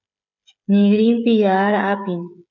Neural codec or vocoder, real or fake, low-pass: codec, 16 kHz, 16 kbps, FreqCodec, smaller model; fake; 7.2 kHz